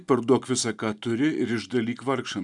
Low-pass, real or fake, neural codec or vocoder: 10.8 kHz; real; none